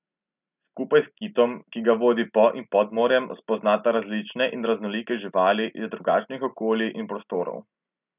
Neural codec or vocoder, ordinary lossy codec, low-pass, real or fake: none; none; 3.6 kHz; real